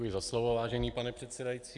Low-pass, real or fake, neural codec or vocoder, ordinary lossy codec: 10.8 kHz; real; none; AAC, 64 kbps